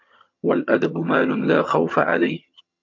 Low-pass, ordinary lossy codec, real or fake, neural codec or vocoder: 7.2 kHz; MP3, 64 kbps; fake; vocoder, 22.05 kHz, 80 mel bands, HiFi-GAN